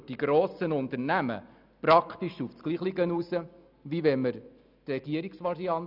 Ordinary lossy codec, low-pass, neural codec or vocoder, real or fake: none; 5.4 kHz; none; real